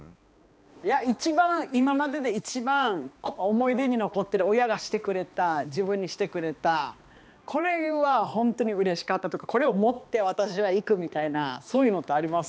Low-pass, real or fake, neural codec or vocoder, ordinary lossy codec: none; fake; codec, 16 kHz, 2 kbps, X-Codec, HuBERT features, trained on balanced general audio; none